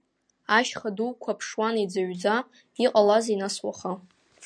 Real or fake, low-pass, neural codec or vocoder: real; 9.9 kHz; none